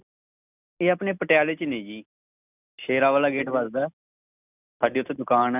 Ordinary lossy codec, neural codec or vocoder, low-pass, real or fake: none; none; 3.6 kHz; real